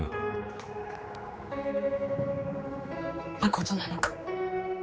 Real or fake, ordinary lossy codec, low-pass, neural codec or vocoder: fake; none; none; codec, 16 kHz, 4 kbps, X-Codec, HuBERT features, trained on general audio